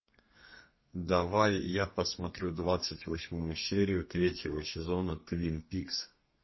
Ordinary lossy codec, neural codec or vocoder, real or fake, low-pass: MP3, 24 kbps; codec, 32 kHz, 1.9 kbps, SNAC; fake; 7.2 kHz